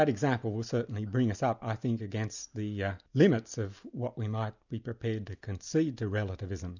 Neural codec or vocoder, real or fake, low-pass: none; real; 7.2 kHz